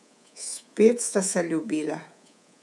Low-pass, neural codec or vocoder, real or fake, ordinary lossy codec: none; codec, 24 kHz, 3.1 kbps, DualCodec; fake; none